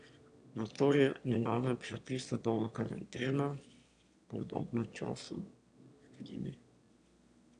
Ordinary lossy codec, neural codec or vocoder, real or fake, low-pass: none; autoencoder, 22.05 kHz, a latent of 192 numbers a frame, VITS, trained on one speaker; fake; 9.9 kHz